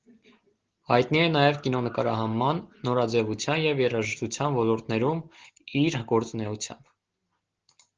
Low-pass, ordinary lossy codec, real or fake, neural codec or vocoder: 7.2 kHz; Opus, 16 kbps; real; none